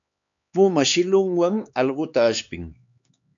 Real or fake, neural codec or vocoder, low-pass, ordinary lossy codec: fake; codec, 16 kHz, 4 kbps, X-Codec, HuBERT features, trained on LibriSpeech; 7.2 kHz; AAC, 64 kbps